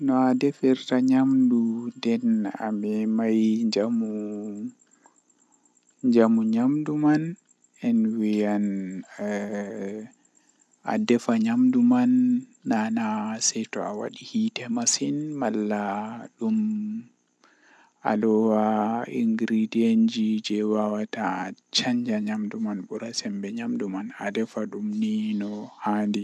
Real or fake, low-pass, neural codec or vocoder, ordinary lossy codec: real; none; none; none